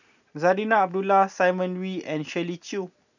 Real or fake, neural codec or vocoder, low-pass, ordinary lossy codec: real; none; 7.2 kHz; none